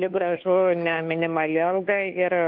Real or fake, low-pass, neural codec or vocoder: fake; 5.4 kHz; codec, 16 kHz, 2 kbps, FunCodec, trained on Chinese and English, 25 frames a second